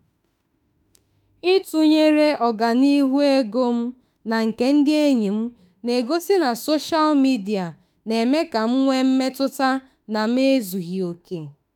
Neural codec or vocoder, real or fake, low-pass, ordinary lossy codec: autoencoder, 48 kHz, 32 numbers a frame, DAC-VAE, trained on Japanese speech; fake; 19.8 kHz; none